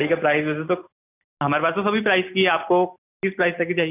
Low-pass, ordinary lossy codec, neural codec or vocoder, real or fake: 3.6 kHz; none; none; real